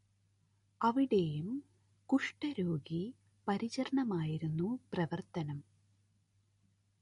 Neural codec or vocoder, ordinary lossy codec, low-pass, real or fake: none; MP3, 48 kbps; 10.8 kHz; real